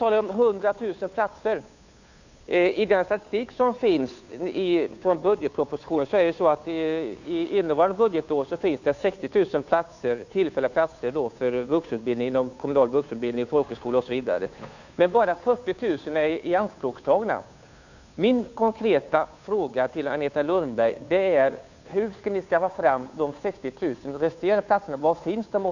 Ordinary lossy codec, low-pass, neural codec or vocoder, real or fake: none; 7.2 kHz; codec, 16 kHz, 2 kbps, FunCodec, trained on Chinese and English, 25 frames a second; fake